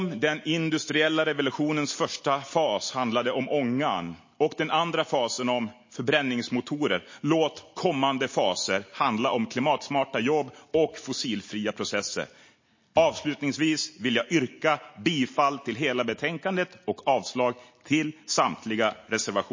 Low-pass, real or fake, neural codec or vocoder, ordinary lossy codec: 7.2 kHz; real; none; MP3, 32 kbps